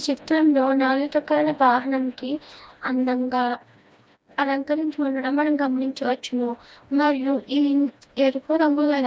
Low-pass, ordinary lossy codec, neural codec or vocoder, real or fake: none; none; codec, 16 kHz, 1 kbps, FreqCodec, smaller model; fake